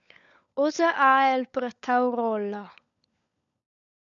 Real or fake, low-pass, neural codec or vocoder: fake; 7.2 kHz; codec, 16 kHz, 16 kbps, FunCodec, trained on LibriTTS, 50 frames a second